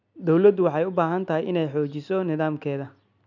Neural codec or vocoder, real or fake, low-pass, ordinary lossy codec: none; real; 7.2 kHz; none